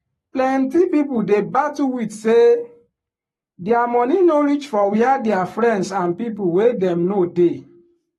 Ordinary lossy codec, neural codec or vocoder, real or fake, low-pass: AAC, 32 kbps; codec, 44.1 kHz, 7.8 kbps, Pupu-Codec; fake; 19.8 kHz